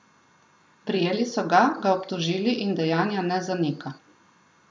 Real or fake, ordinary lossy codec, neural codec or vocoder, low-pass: real; none; none; none